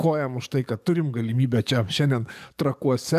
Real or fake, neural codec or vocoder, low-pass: fake; codec, 44.1 kHz, 7.8 kbps, DAC; 14.4 kHz